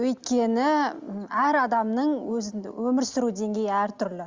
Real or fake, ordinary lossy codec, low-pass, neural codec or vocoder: real; Opus, 32 kbps; 7.2 kHz; none